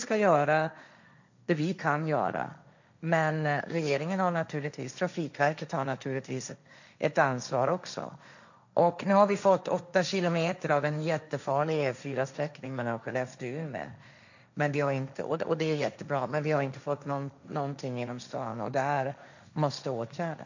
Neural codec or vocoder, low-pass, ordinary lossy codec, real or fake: codec, 16 kHz, 1.1 kbps, Voila-Tokenizer; 7.2 kHz; none; fake